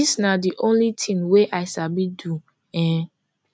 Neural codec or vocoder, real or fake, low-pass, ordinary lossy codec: none; real; none; none